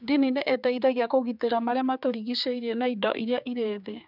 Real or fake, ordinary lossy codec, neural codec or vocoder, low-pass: fake; none; codec, 16 kHz, 4 kbps, X-Codec, HuBERT features, trained on general audio; 5.4 kHz